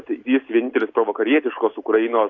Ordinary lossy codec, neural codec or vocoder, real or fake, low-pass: AAC, 48 kbps; none; real; 7.2 kHz